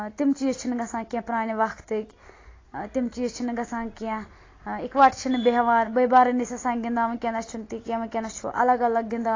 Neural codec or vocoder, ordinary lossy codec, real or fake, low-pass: none; AAC, 32 kbps; real; 7.2 kHz